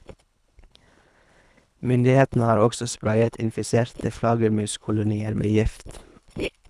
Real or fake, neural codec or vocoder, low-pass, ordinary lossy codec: fake; codec, 24 kHz, 3 kbps, HILCodec; none; none